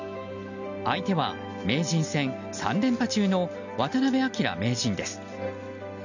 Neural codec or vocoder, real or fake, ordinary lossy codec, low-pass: none; real; none; 7.2 kHz